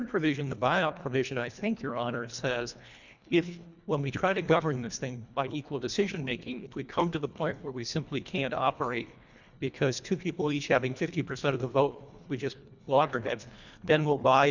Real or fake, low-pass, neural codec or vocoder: fake; 7.2 kHz; codec, 24 kHz, 1.5 kbps, HILCodec